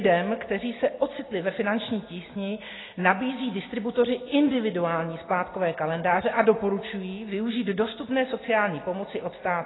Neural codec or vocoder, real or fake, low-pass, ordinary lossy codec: none; real; 7.2 kHz; AAC, 16 kbps